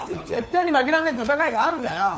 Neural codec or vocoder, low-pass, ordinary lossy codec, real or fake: codec, 16 kHz, 4 kbps, FunCodec, trained on LibriTTS, 50 frames a second; none; none; fake